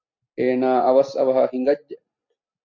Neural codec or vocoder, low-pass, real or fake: none; 7.2 kHz; real